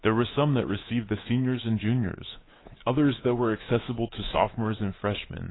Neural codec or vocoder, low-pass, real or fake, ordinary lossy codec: codec, 24 kHz, 3.1 kbps, DualCodec; 7.2 kHz; fake; AAC, 16 kbps